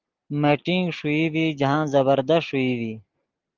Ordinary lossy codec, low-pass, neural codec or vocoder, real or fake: Opus, 16 kbps; 7.2 kHz; none; real